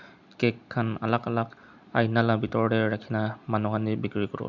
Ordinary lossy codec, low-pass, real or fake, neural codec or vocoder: none; 7.2 kHz; real; none